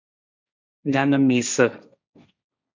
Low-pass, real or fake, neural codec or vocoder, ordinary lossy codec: 7.2 kHz; fake; codec, 16 kHz, 1.1 kbps, Voila-Tokenizer; MP3, 64 kbps